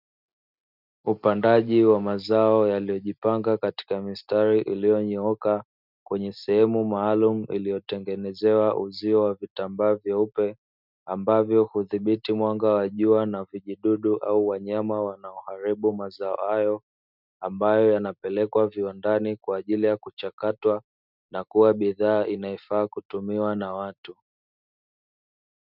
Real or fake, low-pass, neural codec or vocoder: real; 5.4 kHz; none